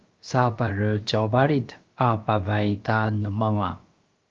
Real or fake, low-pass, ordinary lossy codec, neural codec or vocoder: fake; 7.2 kHz; Opus, 32 kbps; codec, 16 kHz, about 1 kbps, DyCAST, with the encoder's durations